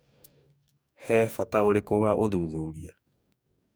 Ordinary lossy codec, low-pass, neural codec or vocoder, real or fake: none; none; codec, 44.1 kHz, 2.6 kbps, DAC; fake